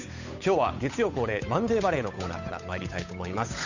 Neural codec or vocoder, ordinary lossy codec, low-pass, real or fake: codec, 16 kHz, 8 kbps, FunCodec, trained on Chinese and English, 25 frames a second; none; 7.2 kHz; fake